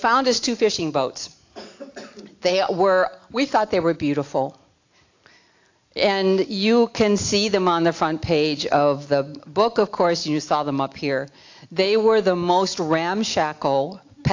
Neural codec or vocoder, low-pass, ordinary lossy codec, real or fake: none; 7.2 kHz; AAC, 48 kbps; real